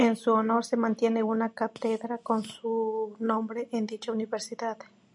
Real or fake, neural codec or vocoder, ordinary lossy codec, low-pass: real; none; MP3, 64 kbps; 10.8 kHz